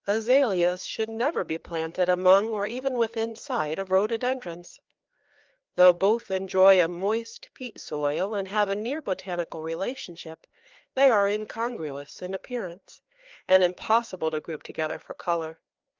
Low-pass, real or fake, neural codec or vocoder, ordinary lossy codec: 7.2 kHz; fake; codec, 16 kHz, 2 kbps, FreqCodec, larger model; Opus, 32 kbps